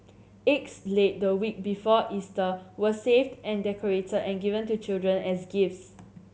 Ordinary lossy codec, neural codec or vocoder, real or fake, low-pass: none; none; real; none